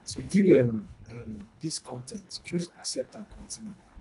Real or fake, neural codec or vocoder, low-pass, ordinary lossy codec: fake; codec, 24 kHz, 1.5 kbps, HILCodec; 10.8 kHz; none